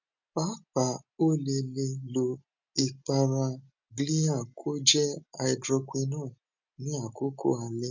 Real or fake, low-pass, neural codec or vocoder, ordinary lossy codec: real; 7.2 kHz; none; none